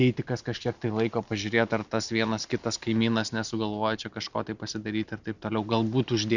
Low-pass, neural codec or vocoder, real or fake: 7.2 kHz; none; real